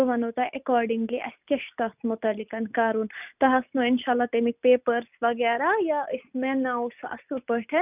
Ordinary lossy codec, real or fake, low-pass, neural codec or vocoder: none; real; 3.6 kHz; none